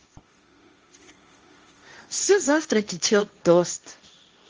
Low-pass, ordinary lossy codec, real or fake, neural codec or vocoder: 7.2 kHz; Opus, 24 kbps; fake; codec, 16 kHz, 1.1 kbps, Voila-Tokenizer